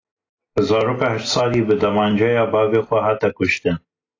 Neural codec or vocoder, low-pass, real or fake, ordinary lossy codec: none; 7.2 kHz; real; AAC, 32 kbps